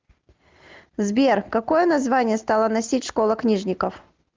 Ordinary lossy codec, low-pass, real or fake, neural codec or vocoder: Opus, 32 kbps; 7.2 kHz; real; none